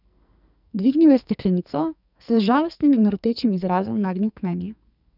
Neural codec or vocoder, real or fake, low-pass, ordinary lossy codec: codec, 44.1 kHz, 2.6 kbps, SNAC; fake; 5.4 kHz; none